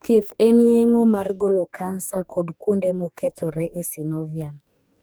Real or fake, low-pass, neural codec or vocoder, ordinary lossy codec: fake; none; codec, 44.1 kHz, 2.6 kbps, DAC; none